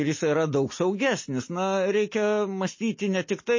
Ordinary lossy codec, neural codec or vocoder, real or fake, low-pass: MP3, 32 kbps; none; real; 7.2 kHz